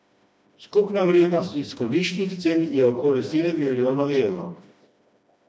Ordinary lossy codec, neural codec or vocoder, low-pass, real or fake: none; codec, 16 kHz, 1 kbps, FreqCodec, smaller model; none; fake